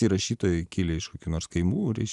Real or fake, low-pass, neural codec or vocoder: real; 10.8 kHz; none